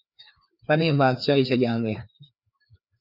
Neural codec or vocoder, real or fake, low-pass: codec, 16 kHz, 2 kbps, FreqCodec, larger model; fake; 5.4 kHz